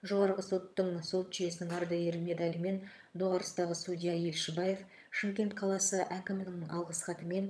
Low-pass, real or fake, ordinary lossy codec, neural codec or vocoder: none; fake; none; vocoder, 22.05 kHz, 80 mel bands, HiFi-GAN